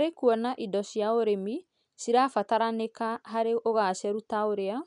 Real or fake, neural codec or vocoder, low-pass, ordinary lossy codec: real; none; 10.8 kHz; none